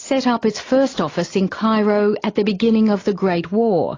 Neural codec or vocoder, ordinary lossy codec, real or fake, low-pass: none; AAC, 32 kbps; real; 7.2 kHz